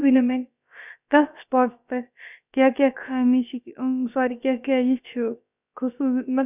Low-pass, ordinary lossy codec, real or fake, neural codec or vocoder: 3.6 kHz; none; fake; codec, 16 kHz, about 1 kbps, DyCAST, with the encoder's durations